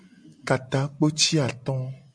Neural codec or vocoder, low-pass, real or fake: none; 9.9 kHz; real